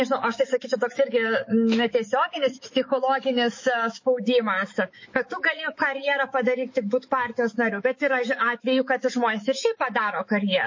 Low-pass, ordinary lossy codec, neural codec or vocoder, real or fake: 7.2 kHz; MP3, 32 kbps; autoencoder, 48 kHz, 128 numbers a frame, DAC-VAE, trained on Japanese speech; fake